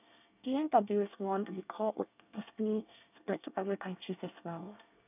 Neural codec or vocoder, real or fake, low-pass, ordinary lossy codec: codec, 24 kHz, 1 kbps, SNAC; fake; 3.6 kHz; none